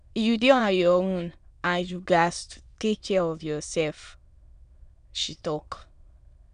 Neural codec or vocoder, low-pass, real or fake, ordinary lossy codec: autoencoder, 22.05 kHz, a latent of 192 numbers a frame, VITS, trained on many speakers; 9.9 kHz; fake; none